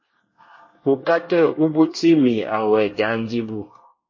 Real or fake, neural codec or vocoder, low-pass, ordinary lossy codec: fake; codec, 24 kHz, 1 kbps, SNAC; 7.2 kHz; MP3, 32 kbps